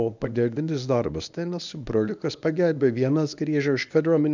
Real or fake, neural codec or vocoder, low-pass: fake; codec, 24 kHz, 0.9 kbps, WavTokenizer, medium speech release version 1; 7.2 kHz